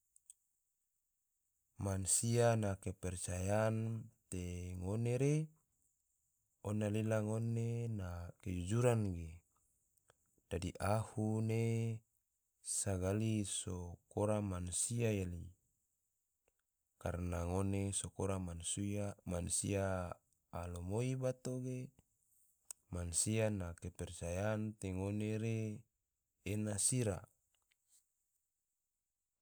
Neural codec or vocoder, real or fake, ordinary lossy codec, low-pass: none; real; none; none